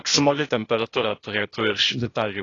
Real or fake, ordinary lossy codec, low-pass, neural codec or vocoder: fake; AAC, 32 kbps; 7.2 kHz; codec, 16 kHz, 0.8 kbps, ZipCodec